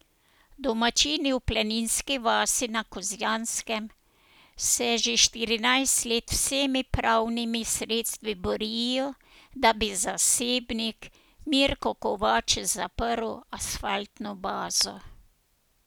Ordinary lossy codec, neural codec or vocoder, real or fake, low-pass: none; none; real; none